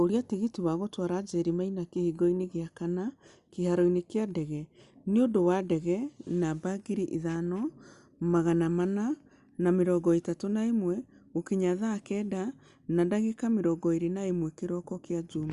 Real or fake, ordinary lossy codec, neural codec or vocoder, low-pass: real; Opus, 64 kbps; none; 10.8 kHz